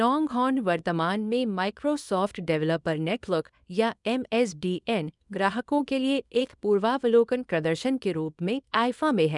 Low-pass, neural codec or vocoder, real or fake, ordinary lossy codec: 10.8 kHz; codec, 24 kHz, 0.9 kbps, WavTokenizer, small release; fake; none